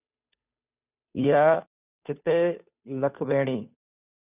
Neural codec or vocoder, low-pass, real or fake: codec, 16 kHz, 2 kbps, FunCodec, trained on Chinese and English, 25 frames a second; 3.6 kHz; fake